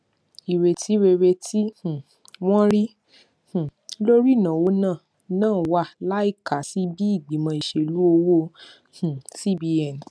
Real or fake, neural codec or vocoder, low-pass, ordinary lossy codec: real; none; none; none